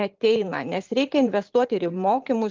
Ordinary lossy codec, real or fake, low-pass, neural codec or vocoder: Opus, 32 kbps; fake; 7.2 kHz; vocoder, 44.1 kHz, 128 mel bands every 512 samples, BigVGAN v2